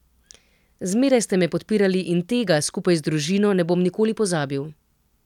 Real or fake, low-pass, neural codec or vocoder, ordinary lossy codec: real; 19.8 kHz; none; none